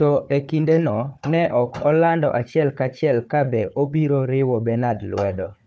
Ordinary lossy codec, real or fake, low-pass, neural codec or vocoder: none; fake; none; codec, 16 kHz, 4 kbps, FunCodec, trained on Chinese and English, 50 frames a second